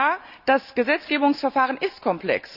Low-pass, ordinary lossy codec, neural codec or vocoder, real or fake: 5.4 kHz; none; none; real